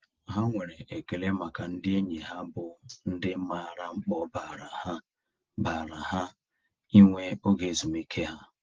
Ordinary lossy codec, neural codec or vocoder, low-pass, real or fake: Opus, 16 kbps; none; 7.2 kHz; real